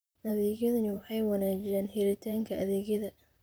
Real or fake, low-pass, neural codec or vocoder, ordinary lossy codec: fake; none; vocoder, 44.1 kHz, 128 mel bands, Pupu-Vocoder; none